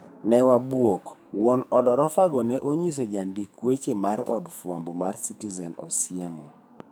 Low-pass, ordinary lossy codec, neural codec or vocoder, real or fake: none; none; codec, 44.1 kHz, 2.6 kbps, SNAC; fake